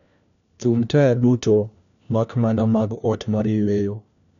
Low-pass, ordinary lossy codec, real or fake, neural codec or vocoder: 7.2 kHz; none; fake; codec, 16 kHz, 1 kbps, FunCodec, trained on LibriTTS, 50 frames a second